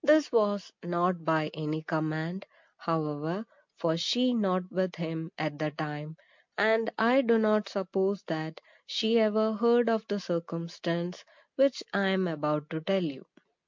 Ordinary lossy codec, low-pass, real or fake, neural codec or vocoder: MP3, 64 kbps; 7.2 kHz; real; none